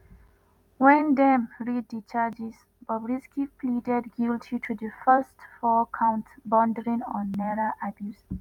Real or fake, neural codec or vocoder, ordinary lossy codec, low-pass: fake; vocoder, 44.1 kHz, 128 mel bands every 256 samples, BigVGAN v2; none; 19.8 kHz